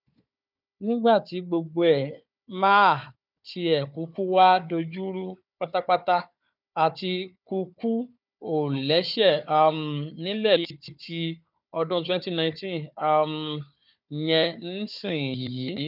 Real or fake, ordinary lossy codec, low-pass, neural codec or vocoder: fake; none; 5.4 kHz; codec, 16 kHz, 4 kbps, FunCodec, trained on Chinese and English, 50 frames a second